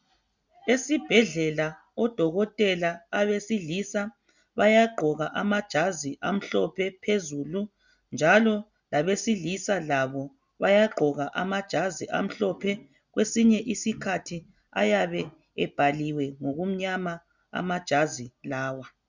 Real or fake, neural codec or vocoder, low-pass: real; none; 7.2 kHz